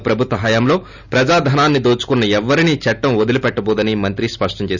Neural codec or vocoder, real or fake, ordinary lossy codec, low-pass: none; real; none; 7.2 kHz